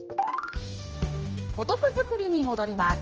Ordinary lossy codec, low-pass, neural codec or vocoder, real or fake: Opus, 24 kbps; 7.2 kHz; codec, 16 kHz, 1 kbps, X-Codec, HuBERT features, trained on general audio; fake